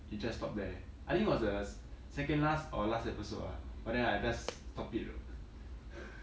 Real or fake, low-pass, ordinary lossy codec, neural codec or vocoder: real; none; none; none